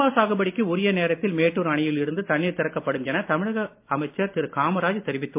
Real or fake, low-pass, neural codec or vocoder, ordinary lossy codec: real; 3.6 kHz; none; MP3, 32 kbps